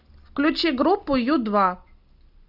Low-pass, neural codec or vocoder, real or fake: 5.4 kHz; none; real